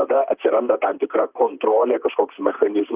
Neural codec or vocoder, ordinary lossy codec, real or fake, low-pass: vocoder, 44.1 kHz, 128 mel bands, Pupu-Vocoder; Opus, 24 kbps; fake; 3.6 kHz